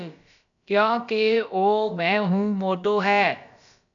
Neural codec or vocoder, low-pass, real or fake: codec, 16 kHz, about 1 kbps, DyCAST, with the encoder's durations; 7.2 kHz; fake